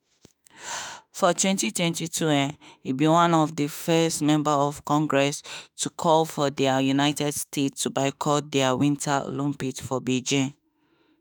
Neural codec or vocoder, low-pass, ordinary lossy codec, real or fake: autoencoder, 48 kHz, 32 numbers a frame, DAC-VAE, trained on Japanese speech; none; none; fake